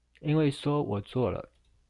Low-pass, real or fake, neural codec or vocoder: 10.8 kHz; real; none